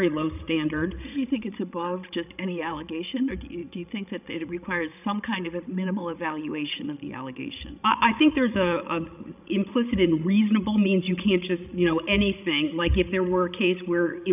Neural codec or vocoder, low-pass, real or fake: codec, 16 kHz, 16 kbps, FreqCodec, larger model; 3.6 kHz; fake